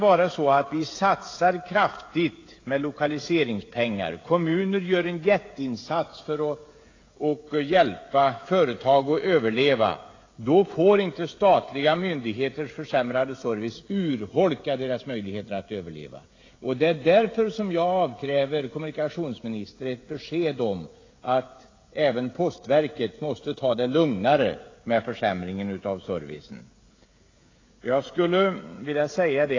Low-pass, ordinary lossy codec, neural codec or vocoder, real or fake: 7.2 kHz; AAC, 32 kbps; codec, 16 kHz, 16 kbps, FreqCodec, smaller model; fake